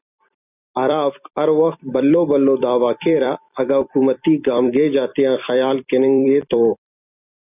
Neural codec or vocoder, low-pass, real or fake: vocoder, 44.1 kHz, 128 mel bands every 256 samples, BigVGAN v2; 3.6 kHz; fake